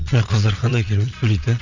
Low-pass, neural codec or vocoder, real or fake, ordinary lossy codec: 7.2 kHz; vocoder, 22.05 kHz, 80 mel bands, Vocos; fake; none